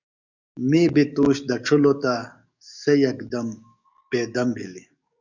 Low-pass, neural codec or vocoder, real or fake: 7.2 kHz; codec, 44.1 kHz, 7.8 kbps, DAC; fake